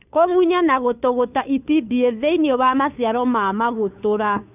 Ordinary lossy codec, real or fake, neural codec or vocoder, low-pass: none; fake; codec, 16 kHz, 2 kbps, FunCodec, trained on Chinese and English, 25 frames a second; 3.6 kHz